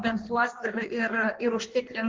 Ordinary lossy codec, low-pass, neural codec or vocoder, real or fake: Opus, 16 kbps; 7.2 kHz; codec, 16 kHz in and 24 kHz out, 1.1 kbps, FireRedTTS-2 codec; fake